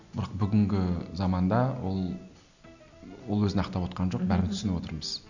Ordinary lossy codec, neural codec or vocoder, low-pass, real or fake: none; none; 7.2 kHz; real